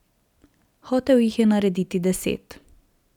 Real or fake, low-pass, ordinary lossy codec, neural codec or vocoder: real; 19.8 kHz; none; none